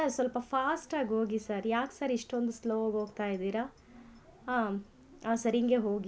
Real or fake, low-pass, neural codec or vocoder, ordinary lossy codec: real; none; none; none